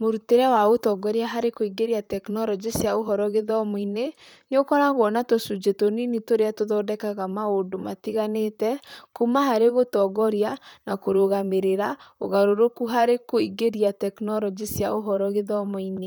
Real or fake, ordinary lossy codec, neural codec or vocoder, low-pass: fake; none; vocoder, 44.1 kHz, 128 mel bands, Pupu-Vocoder; none